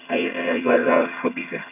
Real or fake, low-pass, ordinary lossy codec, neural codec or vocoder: fake; 3.6 kHz; none; vocoder, 22.05 kHz, 80 mel bands, HiFi-GAN